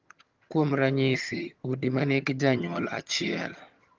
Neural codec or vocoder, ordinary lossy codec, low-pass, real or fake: vocoder, 22.05 kHz, 80 mel bands, HiFi-GAN; Opus, 32 kbps; 7.2 kHz; fake